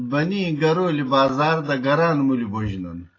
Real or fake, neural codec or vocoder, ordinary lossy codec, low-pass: real; none; AAC, 32 kbps; 7.2 kHz